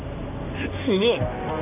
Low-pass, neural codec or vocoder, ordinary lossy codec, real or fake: 3.6 kHz; codec, 16 kHz in and 24 kHz out, 1 kbps, XY-Tokenizer; none; fake